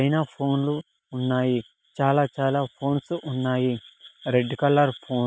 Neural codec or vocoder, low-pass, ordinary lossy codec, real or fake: none; none; none; real